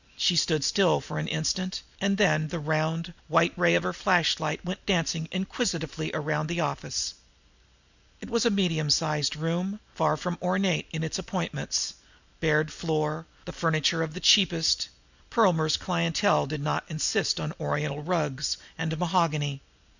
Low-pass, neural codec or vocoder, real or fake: 7.2 kHz; none; real